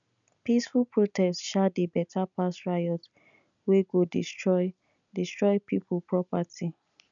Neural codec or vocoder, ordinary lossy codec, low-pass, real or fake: none; none; 7.2 kHz; real